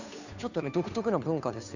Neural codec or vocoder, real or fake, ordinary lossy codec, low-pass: codec, 16 kHz, 2 kbps, FunCodec, trained on Chinese and English, 25 frames a second; fake; none; 7.2 kHz